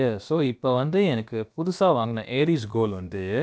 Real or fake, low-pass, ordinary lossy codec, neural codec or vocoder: fake; none; none; codec, 16 kHz, about 1 kbps, DyCAST, with the encoder's durations